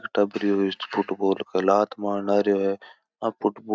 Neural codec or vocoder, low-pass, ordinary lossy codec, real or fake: none; none; none; real